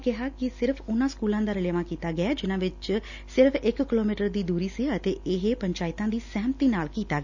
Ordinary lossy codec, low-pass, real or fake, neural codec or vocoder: none; 7.2 kHz; real; none